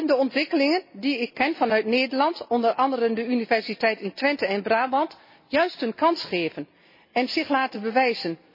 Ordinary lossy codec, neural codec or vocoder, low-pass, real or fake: MP3, 24 kbps; none; 5.4 kHz; real